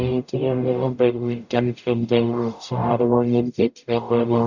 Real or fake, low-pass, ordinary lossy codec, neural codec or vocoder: fake; 7.2 kHz; none; codec, 44.1 kHz, 0.9 kbps, DAC